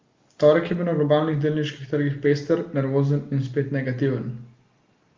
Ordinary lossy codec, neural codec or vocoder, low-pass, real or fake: Opus, 32 kbps; none; 7.2 kHz; real